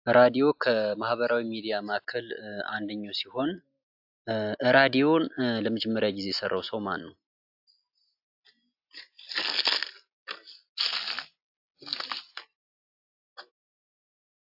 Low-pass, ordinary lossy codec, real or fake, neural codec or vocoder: 5.4 kHz; AAC, 48 kbps; real; none